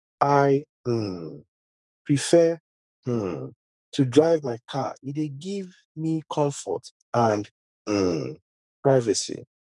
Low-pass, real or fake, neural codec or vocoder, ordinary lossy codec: 10.8 kHz; fake; codec, 44.1 kHz, 2.6 kbps, SNAC; none